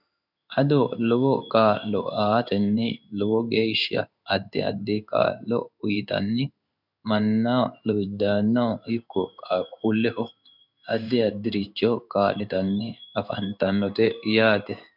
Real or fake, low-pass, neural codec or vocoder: fake; 5.4 kHz; codec, 16 kHz in and 24 kHz out, 1 kbps, XY-Tokenizer